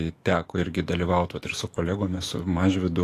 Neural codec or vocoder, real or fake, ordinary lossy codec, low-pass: autoencoder, 48 kHz, 128 numbers a frame, DAC-VAE, trained on Japanese speech; fake; AAC, 48 kbps; 14.4 kHz